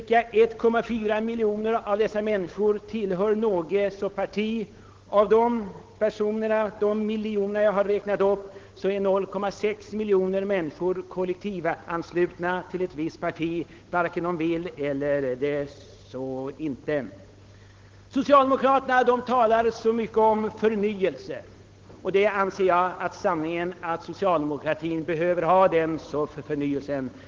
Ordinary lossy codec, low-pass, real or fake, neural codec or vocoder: Opus, 16 kbps; 7.2 kHz; fake; codec, 16 kHz, 8 kbps, FunCodec, trained on Chinese and English, 25 frames a second